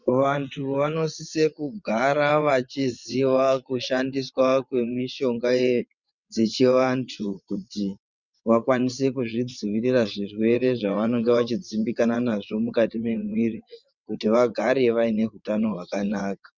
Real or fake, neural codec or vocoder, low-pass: fake; vocoder, 22.05 kHz, 80 mel bands, WaveNeXt; 7.2 kHz